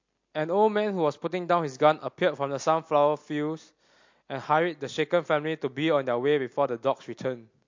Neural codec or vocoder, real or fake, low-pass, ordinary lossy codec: none; real; 7.2 kHz; MP3, 48 kbps